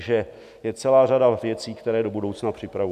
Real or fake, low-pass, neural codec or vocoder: real; 14.4 kHz; none